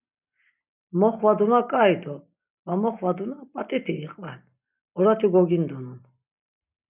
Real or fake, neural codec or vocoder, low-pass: real; none; 3.6 kHz